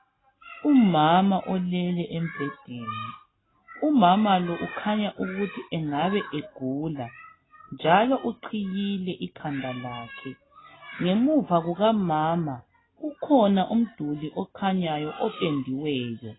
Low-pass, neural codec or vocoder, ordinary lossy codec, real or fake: 7.2 kHz; none; AAC, 16 kbps; real